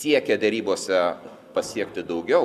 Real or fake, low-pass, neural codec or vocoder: real; 14.4 kHz; none